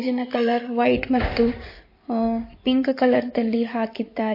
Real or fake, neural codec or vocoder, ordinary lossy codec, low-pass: fake; codec, 16 kHz in and 24 kHz out, 2.2 kbps, FireRedTTS-2 codec; MP3, 32 kbps; 5.4 kHz